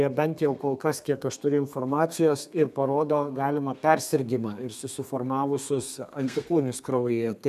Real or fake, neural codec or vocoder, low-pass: fake; codec, 32 kHz, 1.9 kbps, SNAC; 14.4 kHz